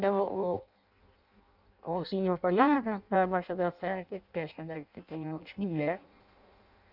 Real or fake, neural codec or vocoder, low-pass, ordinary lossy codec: fake; codec, 16 kHz in and 24 kHz out, 0.6 kbps, FireRedTTS-2 codec; 5.4 kHz; none